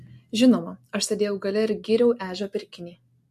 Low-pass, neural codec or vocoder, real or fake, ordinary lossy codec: 14.4 kHz; none; real; MP3, 64 kbps